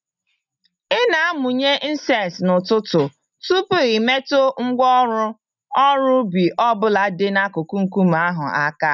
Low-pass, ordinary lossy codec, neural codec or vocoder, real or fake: 7.2 kHz; none; none; real